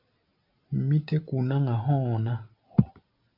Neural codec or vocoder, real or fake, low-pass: none; real; 5.4 kHz